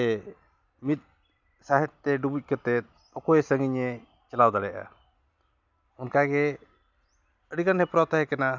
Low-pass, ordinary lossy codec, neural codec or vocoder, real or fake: 7.2 kHz; none; none; real